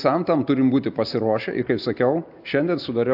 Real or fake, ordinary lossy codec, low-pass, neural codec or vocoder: real; AAC, 48 kbps; 5.4 kHz; none